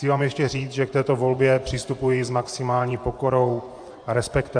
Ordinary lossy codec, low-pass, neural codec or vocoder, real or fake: AAC, 64 kbps; 9.9 kHz; vocoder, 24 kHz, 100 mel bands, Vocos; fake